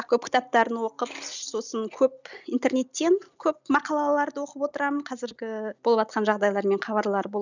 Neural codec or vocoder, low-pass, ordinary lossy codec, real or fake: none; 7.2 kHz; none; real